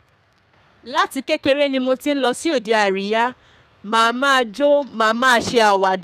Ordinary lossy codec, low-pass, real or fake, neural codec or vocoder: none; 14.4 kHz; fake; codec, 32 kHz, 1.9 kbps, SNAC